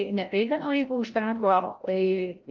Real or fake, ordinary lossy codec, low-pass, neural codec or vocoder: fake; Opus, 24 kbps; 7.2 kHz; codec, 16 kHz, 0.5 kbps, FreqCodec, larger model